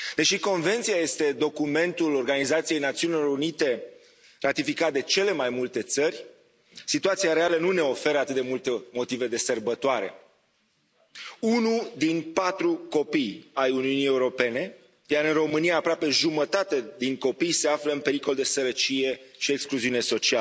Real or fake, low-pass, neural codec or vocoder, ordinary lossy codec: real; none; none; none